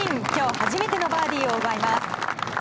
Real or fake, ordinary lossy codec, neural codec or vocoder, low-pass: real; none; none; none